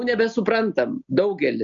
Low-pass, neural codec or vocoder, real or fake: 7.2 kHz; none; real